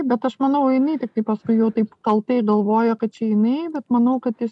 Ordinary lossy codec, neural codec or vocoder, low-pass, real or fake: MP3, 96 kbps; none; 10.8 kHz; real